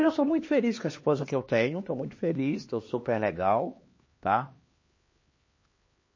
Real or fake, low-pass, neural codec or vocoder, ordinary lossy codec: fake; 7.2 kHz; codec, 16 kHz, 2 kbps, X-Codec, HuBERT features, trained on LibriSpeech; MP3, 32 kbps